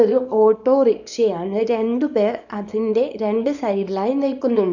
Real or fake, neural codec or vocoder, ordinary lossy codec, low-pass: fake; codec, 24 kHz, 0.9 kbps, WavTokenizer, small release; none; 7.2 kHz